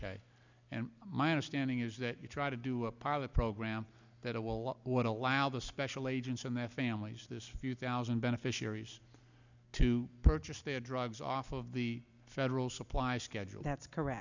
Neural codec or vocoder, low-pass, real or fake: none; 7.2 kHz; real